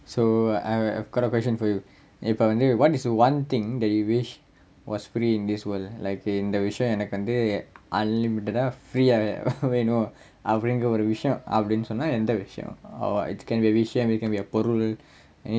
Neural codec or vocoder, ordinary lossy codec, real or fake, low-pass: none; none; real; none